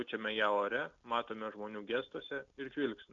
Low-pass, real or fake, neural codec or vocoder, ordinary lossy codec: 7.2 kHz; real; none; MP3, 64 kbps